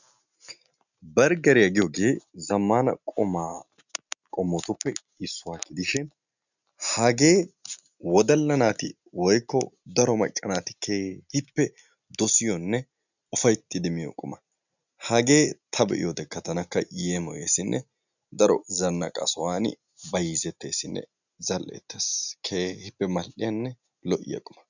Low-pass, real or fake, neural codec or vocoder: 7.2 kHz; real; none